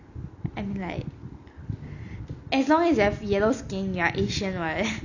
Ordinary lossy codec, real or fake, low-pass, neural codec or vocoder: none; real; 7.2 kHz; none